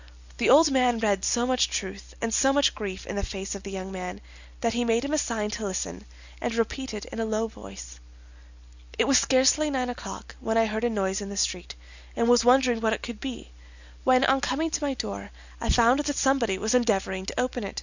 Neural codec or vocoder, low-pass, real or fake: none; 7.2 kHz; real